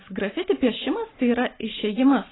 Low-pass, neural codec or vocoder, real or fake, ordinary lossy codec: 7.2 kHz; vocoder, 44.1 kHz, 128 mel bands every 512 samples, BigVGAN v2; fake; AAC, 16 kbps